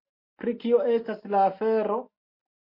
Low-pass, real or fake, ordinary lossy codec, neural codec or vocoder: 5.4 kHz; real; MP3, 32 kbps; none